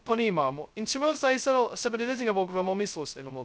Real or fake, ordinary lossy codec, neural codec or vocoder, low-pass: fake; none; codec, 16 kHz, 0.2 kbps, FocalCodec; none